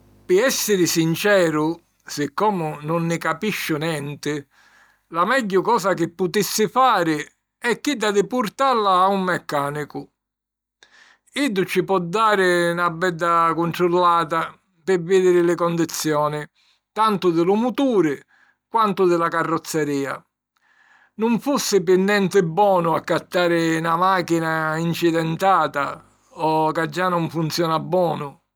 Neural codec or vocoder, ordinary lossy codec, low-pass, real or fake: none; none; none; real